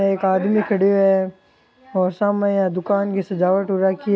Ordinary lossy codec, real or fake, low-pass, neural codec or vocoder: none; real; none; none